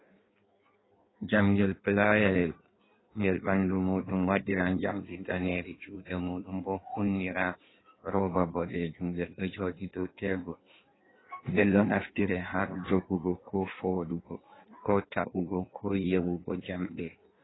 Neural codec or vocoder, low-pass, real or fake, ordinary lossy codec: codec, 16 kHz in and 24 kHz out, 1.1 kbps, FireRedTTS-2 codec; 7.2 kHz; fake; AAC, 16 kbps